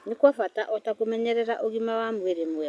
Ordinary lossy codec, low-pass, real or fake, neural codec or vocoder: none; none; real; none